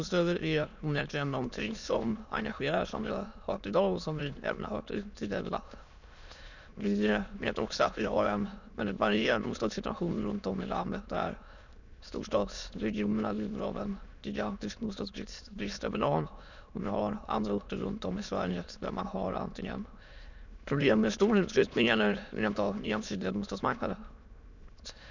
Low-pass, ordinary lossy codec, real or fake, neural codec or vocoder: 7.2 kHz; none; fake; autoencoder, 22.05 kHz, a latent of 192 numbers a frame, VITS, trained on many speakers